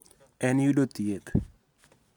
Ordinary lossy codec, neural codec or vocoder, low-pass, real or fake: none; none; 19.8 kHz; real